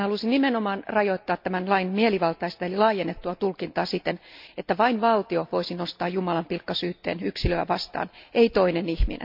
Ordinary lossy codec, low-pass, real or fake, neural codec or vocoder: MP3, 48 kbps; 5.4 kHz; real; none